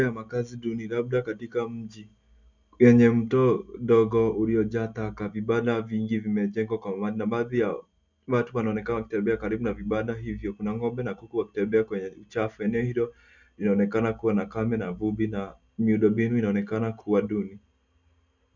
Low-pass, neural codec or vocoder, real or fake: 7.2 kHz; none; real